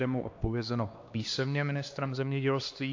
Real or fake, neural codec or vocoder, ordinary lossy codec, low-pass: fake; codec, 16 kHz, 2 kbps, X-Codec, HuBERT features, trained on LibriSpeech; AAC, 48 kbps; 7.2 kHz